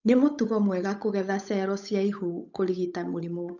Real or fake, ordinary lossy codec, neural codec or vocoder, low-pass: fake; none; codec, 16 kHz, 8 kbps, FunCodec, trained on Chinese and English, 25 frames a second; 7.2 kHz